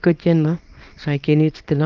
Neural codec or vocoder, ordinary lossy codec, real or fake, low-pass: codec, 24 kHz, 0.9 kbps, WavTokenizer, small release; Opus, 32 kbps; fake; 7.2 kHz